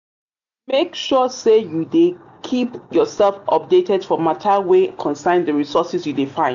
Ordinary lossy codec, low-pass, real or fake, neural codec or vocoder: none; 7.2 kHz; real; none